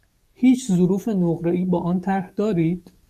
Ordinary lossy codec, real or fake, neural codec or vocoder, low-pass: MP3, 64 kbps; fake; codec, 44.1 kHz, 7.8 kbps, DAC; 14.4 kHz